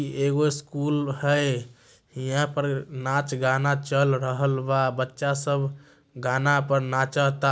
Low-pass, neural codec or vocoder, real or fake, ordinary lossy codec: none; none; real; none